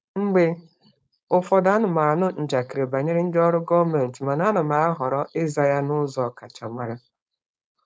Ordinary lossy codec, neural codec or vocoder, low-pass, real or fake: none; codec, 16 kHz, 4.8 kbps, FACodec; none; fake